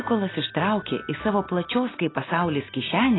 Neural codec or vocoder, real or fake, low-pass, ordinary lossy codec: none; real; 7.2 kHz; AAC, 16 kbps